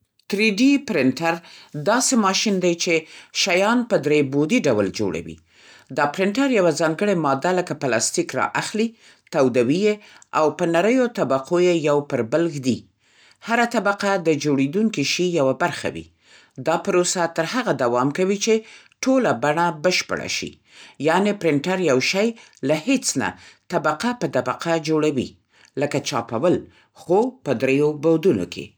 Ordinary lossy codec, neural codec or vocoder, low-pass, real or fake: none; none; none; real